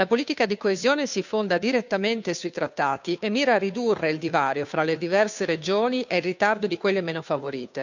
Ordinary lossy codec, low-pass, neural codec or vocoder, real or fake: none; 7.2 kHz; codec, 16 kHz, 2 kbps, FunCodec, trained on Chinese and English, 25 frames a second; fake